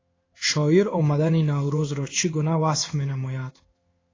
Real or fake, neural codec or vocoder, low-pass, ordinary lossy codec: fake; codec, 16 kHz in and 24 kHz out, 1 kbps, XY-Tokenizer; 7.2 kHz; AAC, 32 kbps